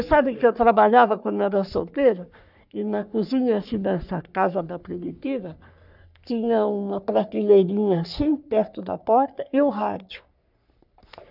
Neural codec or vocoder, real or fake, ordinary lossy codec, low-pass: codec, 44.1 kHz, 3.4 kbps, Pupu-Codec; fake; none; 5.4 kHz